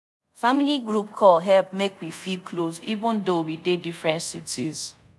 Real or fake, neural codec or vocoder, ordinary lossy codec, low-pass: fake; codec, 24 kHz, 0.5 kbps, DualCodec; none; none